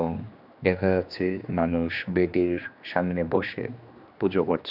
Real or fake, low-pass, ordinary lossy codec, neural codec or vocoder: fake; 5.4 kHz; none; codec, 16 kHz, 2 kbps, X-Codec, HuBERT features, trained on general audio